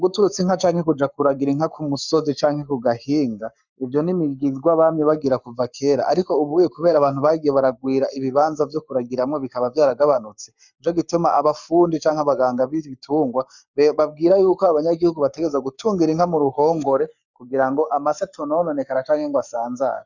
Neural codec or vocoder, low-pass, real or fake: codec, 44.1 kHz, 7.8 kbps, Pupu-Codec; 7.2 kHz; fake